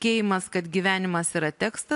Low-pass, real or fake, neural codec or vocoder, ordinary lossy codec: 10.8 kHz; real; none; AAC, 64 kbps